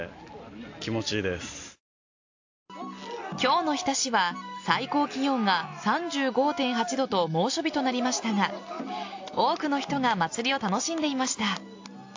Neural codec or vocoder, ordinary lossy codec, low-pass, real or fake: none; AAC, 48 kbps; 7.2 kHz; real